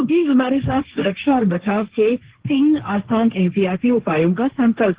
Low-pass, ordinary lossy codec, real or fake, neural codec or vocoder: 3.6 kHz; Opus, 16 kbps; fake; codec, 16 kHz, 1.1 kbps, Voila-Tokenizer